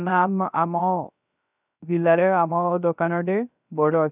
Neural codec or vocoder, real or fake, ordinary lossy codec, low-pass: codec, 16 kHz, 0.3 kbps, FocalCodec; fake; none; 3.6 kHz